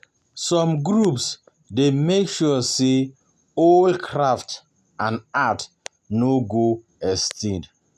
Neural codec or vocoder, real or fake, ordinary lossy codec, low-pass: none; real; none; 14.4 kHz